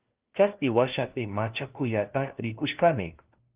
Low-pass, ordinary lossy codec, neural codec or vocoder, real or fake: 3.6 kHz; Opus, 24 kbps; codec, 16 kHz, 1 kbps, FunCodec, trained on LibriTTS, 50 frames a second; fake